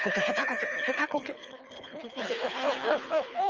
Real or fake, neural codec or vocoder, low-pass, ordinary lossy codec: fake; codec, 24 kHz, 3 kbps, HILCodec; 7.2 kHz; Opus, 24 kbps